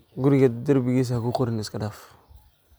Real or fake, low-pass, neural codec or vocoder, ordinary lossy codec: real; none; none; none